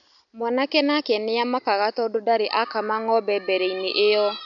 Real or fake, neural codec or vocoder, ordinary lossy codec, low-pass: real; none; none; 7.2 kHz